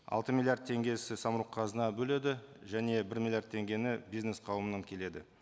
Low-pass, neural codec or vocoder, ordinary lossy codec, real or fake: none; none; none; real